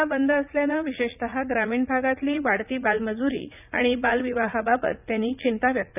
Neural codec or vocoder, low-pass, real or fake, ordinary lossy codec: vocoder, 44.1 kHz, 80 mel bands, Vocos; 3.6 kHz; fake; none